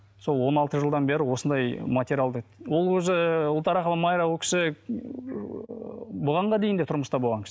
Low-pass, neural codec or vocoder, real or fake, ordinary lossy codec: none; none; real; none